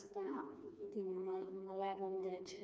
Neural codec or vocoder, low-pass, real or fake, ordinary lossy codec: codec, 16 kHz, 2 kbps, FreqCodec, smaller model; none; fake; none